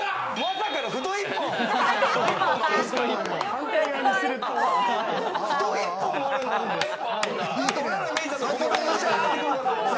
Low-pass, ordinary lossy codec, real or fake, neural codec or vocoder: none; none; real; none